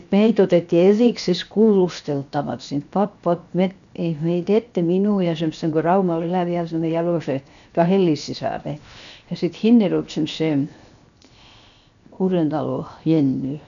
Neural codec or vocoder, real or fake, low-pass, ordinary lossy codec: codec, 16 kHz, 0.7 kbps, FocalCodec; fake; 7.2 kHz; none